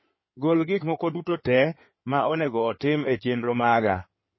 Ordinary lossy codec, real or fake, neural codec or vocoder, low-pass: MP3, 24 kbps; fake; codec, 16 kHz in and 24 kHz out, 2.2 kbps, FireRedTTS-2 codec; 7.2 kHz